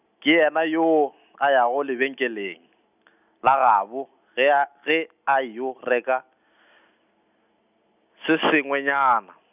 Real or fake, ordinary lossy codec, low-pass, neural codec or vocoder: real; none; 3.6 kHz; none